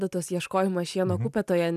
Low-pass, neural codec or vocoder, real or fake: 14.4 kHz; none; real